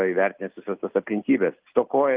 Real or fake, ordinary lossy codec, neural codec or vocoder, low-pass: real; Opus, 32 kbps; none; 3.6 kHz